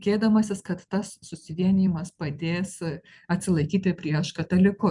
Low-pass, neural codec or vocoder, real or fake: 10.8 kHz; vocoder, 44.1 kHz, 128 mel bands every 256 samples, BigVGAN v2; fake